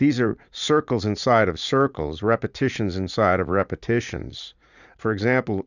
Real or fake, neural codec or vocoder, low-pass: fake; vocoder, 44.1 kHz, 80 mel bands, Vocos; 7.2 kHz